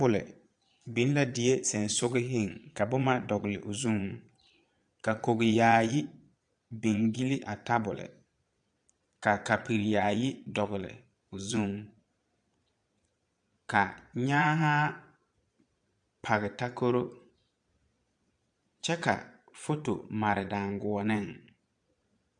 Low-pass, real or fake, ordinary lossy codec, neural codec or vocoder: 9.9 kHz; fake; AAC, 64 kbps; vocoder, 22.05 kHz, 80 mel bands, Vocos